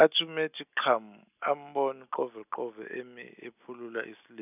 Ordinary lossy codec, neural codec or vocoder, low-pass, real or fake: none; none; 3.6 kHz; real